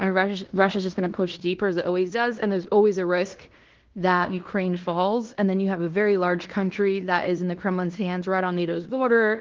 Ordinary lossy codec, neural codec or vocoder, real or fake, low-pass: Opus, 16 kbps; codec, 16 kHz in and 24 kHz out, 0.9 kbps, LongCat-Audio-Codec, fine tuned four codebook decoder; fake; 7.2 kHz